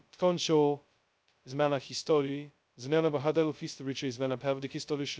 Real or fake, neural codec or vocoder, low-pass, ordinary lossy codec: fake; codec, 16 kHz, 0.2 kbps, FocalCodec; none; none